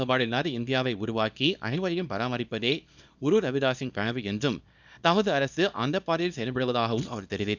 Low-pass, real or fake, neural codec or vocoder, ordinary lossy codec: 7.2 kHz; fake; codec, 24 kHz, 0.9 kbps, WavTokenizer, small release; none